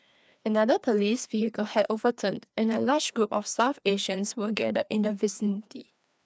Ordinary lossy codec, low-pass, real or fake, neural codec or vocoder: none; none; fake; codec, 16 kHz, 2 kbps, FreqCodec, larger model